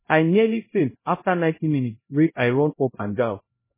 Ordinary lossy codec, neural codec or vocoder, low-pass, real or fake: MP3, 16 kbps; codec, 16 kHz, 0.5 kbps, X-Codec, HuBERT features, trained on LibriSpeech; 3.6 kHz; fake